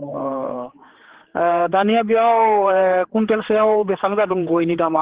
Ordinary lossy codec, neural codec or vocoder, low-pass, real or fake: Opus, 16 kbps; codec, 16 kHz, 4 kbps, X-Codec, HuBERT features, trained on general audio; 3.6 kHz; fake